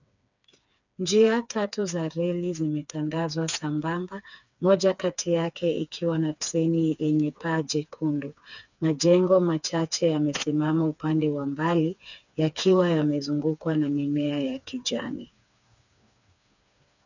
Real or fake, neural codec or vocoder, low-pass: fake; codec, 16 kHz, 4 kbps, FreqCodec, smaller model; 7.2 kHz